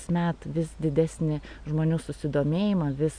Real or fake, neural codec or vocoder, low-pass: real; none; 9.9 kHz